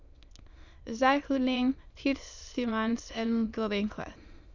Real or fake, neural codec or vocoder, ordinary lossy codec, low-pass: fake; autoencoder, 22.05 kHz, a latent of 192 numbers a frame, VITS, trained on many speakers; Opus, 64 kbps; 7.2 kHz